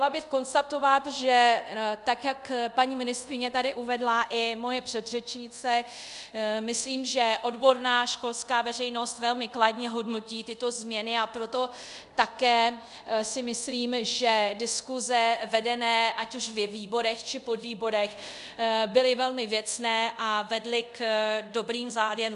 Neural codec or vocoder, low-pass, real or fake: codec, 24 kHz, 0.5 kbps, DualCodec; 10.8 kHz; fake